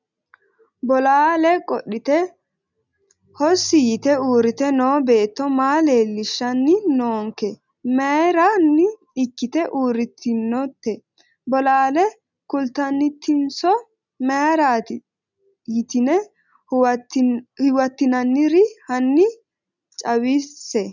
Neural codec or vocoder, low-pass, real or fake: none; 7.2 kHz; real